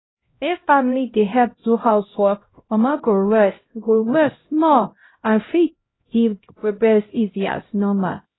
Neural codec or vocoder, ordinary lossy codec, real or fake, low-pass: codec, 16 kHz, 0.5 kbps, X-Codec, HuBERT features, trained on LibriSpeech; AAC, 16 kbps; fake; 7.2 kHz